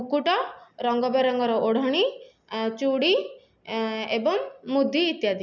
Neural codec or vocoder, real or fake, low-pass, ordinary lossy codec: none; real; 7.2 kHz; none